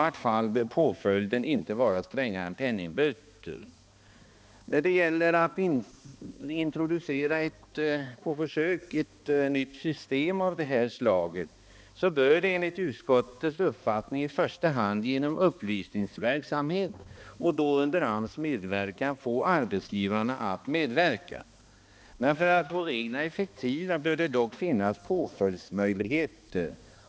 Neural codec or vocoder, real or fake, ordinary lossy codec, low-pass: codec, 16 kHz, 2 kbps, X-Codec, HuBERT features, trained on balanced general audio; fake; none; none